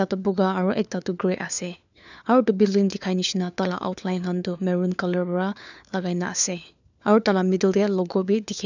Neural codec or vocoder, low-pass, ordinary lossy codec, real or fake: codec, 16 kHz, 4 kbps, FunCodec, trained on LibriTTS, 50 frames a second; 7.2 kHz; none; fake